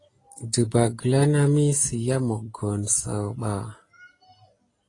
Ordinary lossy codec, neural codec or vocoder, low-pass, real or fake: AAC, 32 kbps; none; 10.8 kHz; real